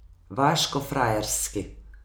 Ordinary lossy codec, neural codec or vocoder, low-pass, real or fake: none; none; none; real